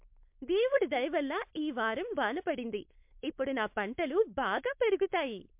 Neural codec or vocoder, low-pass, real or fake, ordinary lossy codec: codec, 16 kHz, 4.8 kbps, FACodec; 3.6 kHz; fake; MP3, 32 kbps